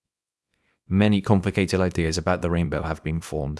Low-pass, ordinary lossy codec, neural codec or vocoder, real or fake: none; none; codec, 24 kHz, 0.9 kbps, WavTokenizer, small release; fake